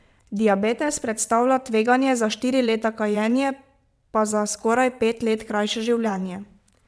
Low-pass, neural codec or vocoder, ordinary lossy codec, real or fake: none; vocoder, 22.05 kHz, 80 mel bands, WaveNeXt; none; fake